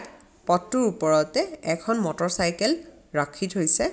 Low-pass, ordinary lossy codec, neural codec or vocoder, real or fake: none; none; none; real